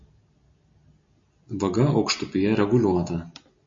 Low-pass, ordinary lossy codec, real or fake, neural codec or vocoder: 7.2 kHz; MP3, 32 kbps; real; none